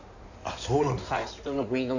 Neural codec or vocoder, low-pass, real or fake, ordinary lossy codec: codec, 16 kHz in and 24 kHz out, 2.2 kbps, FireRedTTS-2 codec; 7.2 kHz; fake; none